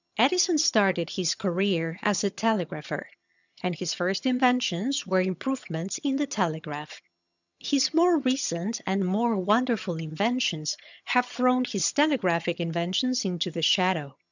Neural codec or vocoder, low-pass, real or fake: vocoder, 22.05 kHz, 80 mel bands, HiFi-GAN; 7.2 kHz; fake